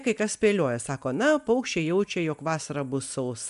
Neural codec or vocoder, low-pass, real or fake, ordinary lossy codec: none; 10.8 kHz; real; MP3, 96 kbps